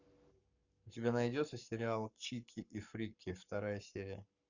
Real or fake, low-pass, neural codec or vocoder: fake; 7.2 kHz; codec, 44.1 kHz, 7.8 kbps, Pupu-Codec